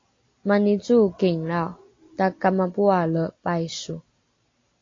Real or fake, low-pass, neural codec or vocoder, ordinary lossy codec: real; 7.2 kHz; none; AAC, 32 kbps